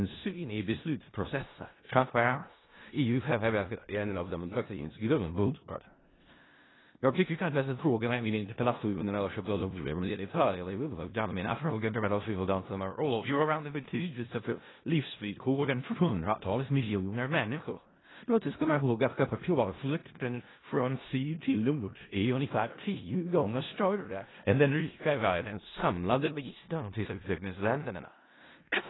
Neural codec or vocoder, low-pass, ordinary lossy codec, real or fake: codec, 16 kHz in and 24 kHz out, 0.4 kbps, LongCat-Audio-Codec, four codebook decoder; 7.2 kHz; AAC, 16 kbps; fake